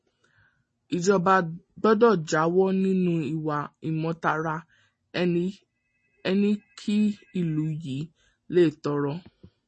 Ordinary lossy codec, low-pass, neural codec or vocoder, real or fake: MP3, 32 kbps; 9.9 kHz; none; real